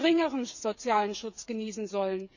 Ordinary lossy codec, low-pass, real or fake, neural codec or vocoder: none; 7.2 kHz; fake; codec, 16 kHz, 8 kbps, FreqCodec, smaller model